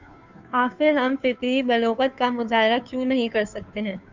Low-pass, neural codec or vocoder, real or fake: 7.2 kHz; codec, 16 kHz, 2 kbps, FunCodec, trained on Chinese and English, 25 frames a second; fake